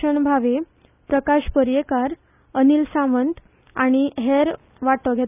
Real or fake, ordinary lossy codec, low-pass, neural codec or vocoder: real; none; 3.6 kHz; none